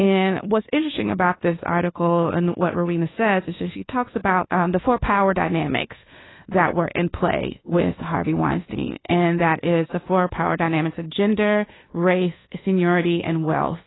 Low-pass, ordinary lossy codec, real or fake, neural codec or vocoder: 7.2 kHz; AAC, 16 kbps; fake; codec, 24 kHz, 1.2 kbps, DualCodec